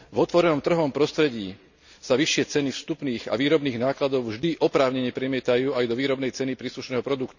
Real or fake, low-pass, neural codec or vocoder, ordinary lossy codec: real; 7.2 kHz; none; none